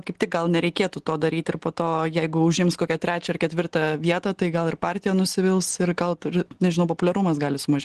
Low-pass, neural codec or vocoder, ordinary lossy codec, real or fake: 10.8 kHz; none; Opus, 16 kbps; real